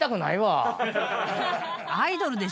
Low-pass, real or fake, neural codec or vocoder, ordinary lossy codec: none; real; none; none